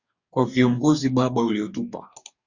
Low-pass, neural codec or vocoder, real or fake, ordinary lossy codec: 7.2 kHz; codec, 44.1 kHz, 2.6 kbps, DAC; fake; Opus, 64 kbps